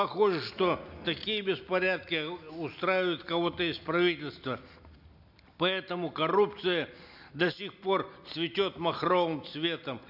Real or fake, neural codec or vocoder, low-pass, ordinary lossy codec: real; none; 5.4 kHz; none